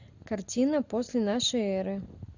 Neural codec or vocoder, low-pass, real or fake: none; 7.2 kHz; real